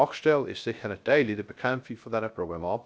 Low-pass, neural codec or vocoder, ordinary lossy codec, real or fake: none; codec, 16 kHz, 0.3 kbps, FocalCodec; none; fake